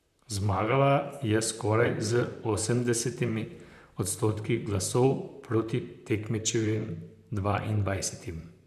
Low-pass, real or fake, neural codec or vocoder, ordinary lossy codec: 14.4 kHz; fake; vocoder, 44.1 kHz, 128 mel bands, Pupu-Vocoder; none